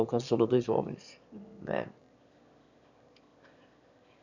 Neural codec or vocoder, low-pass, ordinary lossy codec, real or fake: autoencoder, 22.05 kHz, a latent of 192 numbers a frame, VITS, trained on one speaker; 7.2 kHz; none; fake